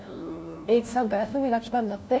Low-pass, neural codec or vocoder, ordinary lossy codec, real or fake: none; codec, 16 kHz, 1 kbps, FunCodec, trained on LibriTTS, 50 frames a second; none; fake